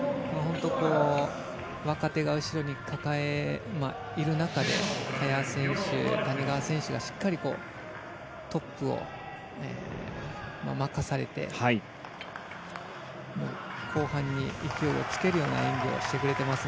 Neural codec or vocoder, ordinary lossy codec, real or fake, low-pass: none; none; real; none